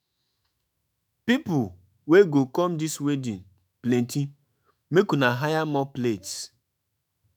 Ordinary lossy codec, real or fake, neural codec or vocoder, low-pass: none; fake; autoencoder, 48 kHz, 128 numbers a frame, DAC-VAE, trained on Japanese speech; none